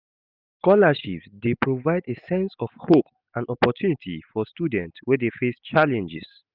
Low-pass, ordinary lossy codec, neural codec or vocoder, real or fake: 5.4 kHz; none; none; real